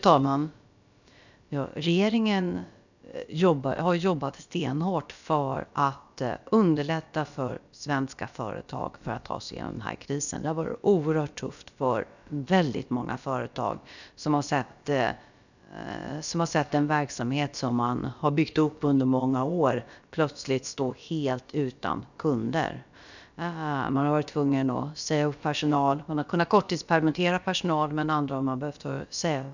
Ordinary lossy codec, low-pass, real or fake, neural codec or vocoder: none; 7.2 kHz; fake; codec, 16 kHz, about 1 kbps, DyCAST, with the encoder's durations